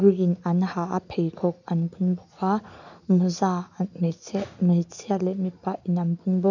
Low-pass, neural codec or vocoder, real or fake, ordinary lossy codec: 7.2 kHz; none; real; none